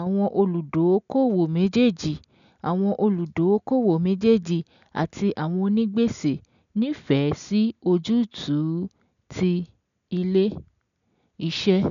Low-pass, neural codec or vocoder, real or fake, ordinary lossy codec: 7.2 kHz; none; real; none